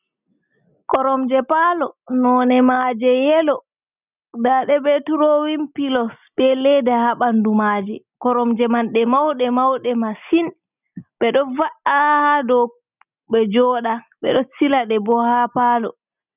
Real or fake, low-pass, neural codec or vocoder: real; 3.6 kHz; none